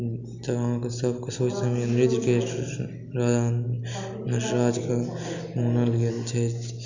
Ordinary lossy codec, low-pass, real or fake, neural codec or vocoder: Opus, 64 kbps; 7.2 kHz; real; none